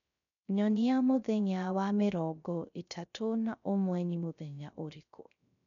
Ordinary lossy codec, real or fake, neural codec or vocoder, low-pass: none; fake; codec, 16 kHz, 0.3 kbps, FocalCodec; 7.2 kHz